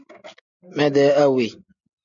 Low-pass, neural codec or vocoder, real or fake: 7.2 kHz; none; real